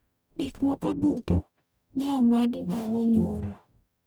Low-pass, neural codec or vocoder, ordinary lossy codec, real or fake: none; codec, 44.1 kHz, 0.9 kbps, DAC; none; fake